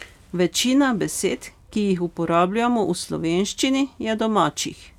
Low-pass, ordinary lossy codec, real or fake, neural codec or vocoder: 19.8 kHz; none; real; none